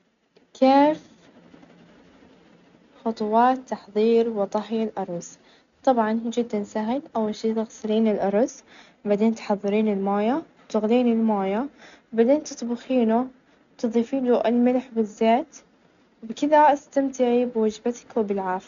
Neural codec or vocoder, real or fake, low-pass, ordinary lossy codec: none; real; 7.2 kHz; none